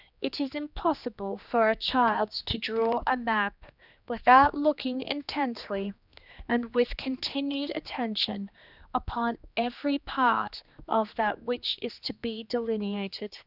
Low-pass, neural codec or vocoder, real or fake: 5.4 kHz; codec, 16 kHz, 2 kbps, X-Codec, HuBERT features, trained on general audio; fake